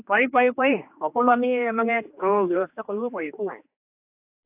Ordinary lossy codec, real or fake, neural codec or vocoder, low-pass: AAC, 32 kbps; fake; codec, 16 kHz, 2 kbps, X-Codec, HuBERT features, trained on general audio; 3.6 kHz